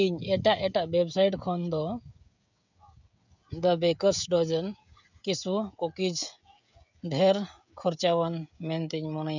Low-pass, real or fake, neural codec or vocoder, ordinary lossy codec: 7.2 kHz; fake; codec, 16 kHz, 16 kbps, FreqCodec, smaller model; none